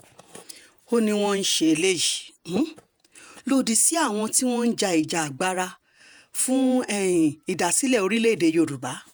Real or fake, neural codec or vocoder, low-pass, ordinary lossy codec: fake; vocoder, 48 kHz, 128 mel bands, Vocos; none; none